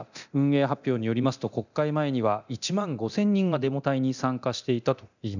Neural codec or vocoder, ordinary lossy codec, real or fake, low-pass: codec, 24 kHz, 0.9 kbps, DualCodec; none; fake; 7.2 kHz